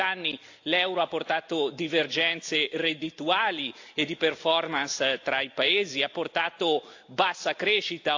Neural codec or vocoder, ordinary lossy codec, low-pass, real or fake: none; AAC, 48 kbps; 7.2 kHz; real